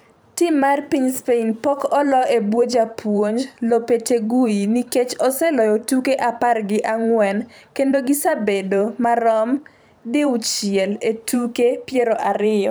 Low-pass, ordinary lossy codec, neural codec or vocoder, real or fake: none; none; vocoder, 44.1 kHz, 128 mel bands every 512 samples, BigVGAN v2; fake